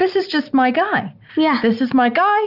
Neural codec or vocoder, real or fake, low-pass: none; real; 5.4 kHz